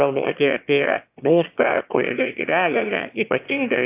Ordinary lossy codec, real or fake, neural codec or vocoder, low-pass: AAC, 32 kbps; fake; autoencoder, 22.05 kHz, a latent of 192 numbers a frame, VITS, trained on one speaker; 3.6 kHz